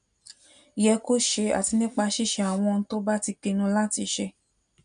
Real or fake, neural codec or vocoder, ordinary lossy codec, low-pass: real; none; none; 9.9 kHz